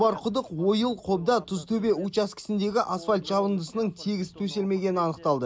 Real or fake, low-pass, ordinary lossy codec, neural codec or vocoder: real; none; none; none